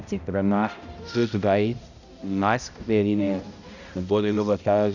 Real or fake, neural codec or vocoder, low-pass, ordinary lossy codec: fake; codec, 16 kHz, 0.5 kbps, X-Codec, HuBERT features, trained on balanced general audio; 7.2 kHz; none